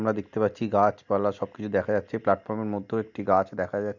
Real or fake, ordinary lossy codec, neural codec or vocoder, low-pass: real; none; none; 7.2 kHz